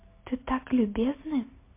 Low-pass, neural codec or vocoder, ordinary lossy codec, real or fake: 3.6 kHz; none; MP3, 24 kbps; real